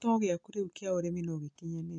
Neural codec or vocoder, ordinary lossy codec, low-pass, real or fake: none; none; 9.9 kHz; real